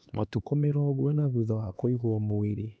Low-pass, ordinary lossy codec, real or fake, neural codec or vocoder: none; none; fake; codec, 16 kHz, 2 kbps, X-Codec, HuBERT features, trained on LibriSpeech